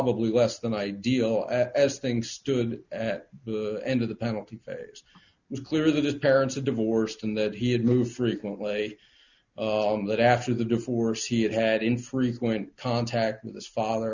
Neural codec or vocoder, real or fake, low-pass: none; real; 7.2 kHz